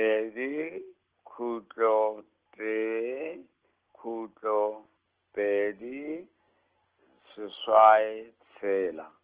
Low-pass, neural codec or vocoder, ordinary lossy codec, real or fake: 3.6 kHz; none; Opus, 16 kbps; real